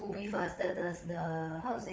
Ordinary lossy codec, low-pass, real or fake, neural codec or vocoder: none; none; fake; codec, 16 kHz, 16 kbps, FunCodec, trained on LibriTTS, 50 frames a second